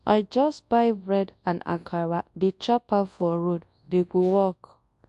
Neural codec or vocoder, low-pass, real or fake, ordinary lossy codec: codec, 24 kHz, 0.9 kbps, WavTokenizer, large speech release; 10.8 kHz; fake; AAC, 64 kbps